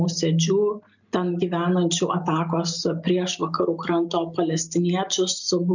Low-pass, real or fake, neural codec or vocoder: 7.2 kHz; real; none